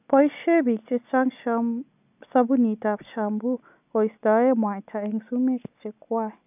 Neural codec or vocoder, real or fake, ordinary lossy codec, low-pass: none; real; none; 3.6 kHz